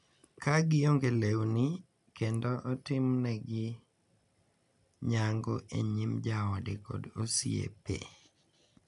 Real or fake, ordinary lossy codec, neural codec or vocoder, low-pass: real; MP3, 96 kbps; none; 10.8 kHz